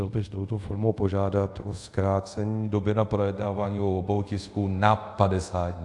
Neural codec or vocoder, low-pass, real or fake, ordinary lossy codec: codec, 24 kHz, 0.5 kbps, DualCodec; 10.8 kHz; fake; AAC, 64 kbps